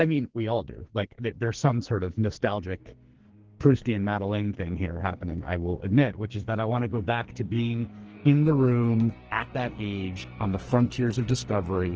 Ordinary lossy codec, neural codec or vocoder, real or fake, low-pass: Opus, 16 kbps; codec, 44.1 kHz, 2.6 kbps, SNAC; fake; 7.2 kHz